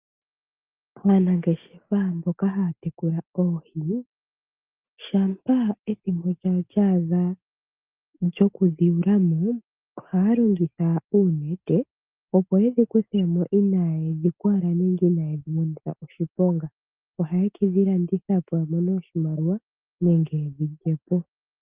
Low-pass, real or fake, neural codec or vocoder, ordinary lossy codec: 3.6 kHz; real; none; Opus, 24 kbps